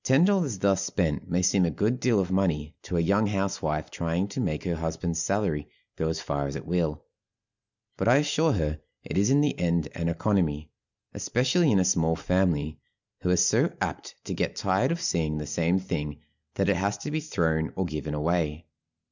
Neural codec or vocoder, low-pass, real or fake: none; 7.2 kHz; real